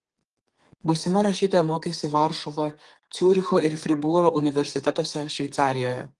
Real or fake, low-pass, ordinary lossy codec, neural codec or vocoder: fake; 10.8 kHz; Opus, 24 kbps; codec, 32 kHz, 1.9 kbps, SNAC